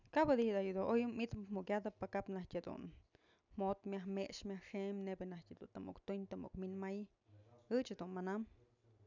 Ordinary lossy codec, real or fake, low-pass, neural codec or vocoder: none; real; 7.2 kHz; none